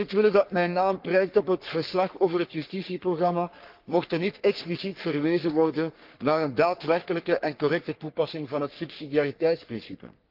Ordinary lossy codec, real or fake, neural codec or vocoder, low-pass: Opus, 24 kbps; fake; codec, 44.1 kHz, 3.4 kbps, Pupu-Codec; 5.4 kHz